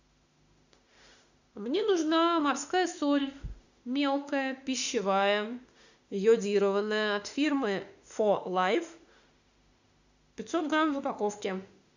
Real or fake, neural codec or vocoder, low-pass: fake; autoencoder, 48 kHz, 32 numbers a frame, DAC-VAE, trained on Japanese speech; 7.2 kHz